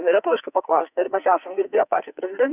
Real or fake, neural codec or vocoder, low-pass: fake; codec, 44.1 kHz, 2.6 kbps, SNAC; 3.6 kHz